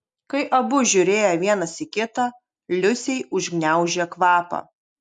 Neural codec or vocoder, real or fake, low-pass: none; real; 9.9 kHz